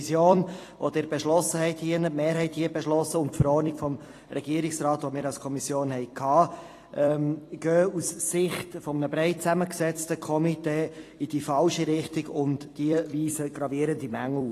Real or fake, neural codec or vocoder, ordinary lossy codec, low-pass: fake; vocoder, 44.1 kHz, 128 mel bands every 256 samples, BigVGAN v2; AAC, 48 kbps; 14.4 kHz